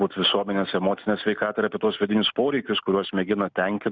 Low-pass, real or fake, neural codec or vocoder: 7.2 kHz; real; none